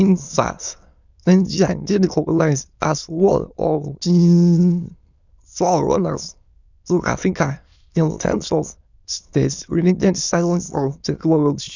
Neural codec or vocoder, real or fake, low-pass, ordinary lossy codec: autoencoder, 22.05 kHz, a latent of 192 numbers a frame, VITS, trained on many speakers; fake; 7.2 kHz; none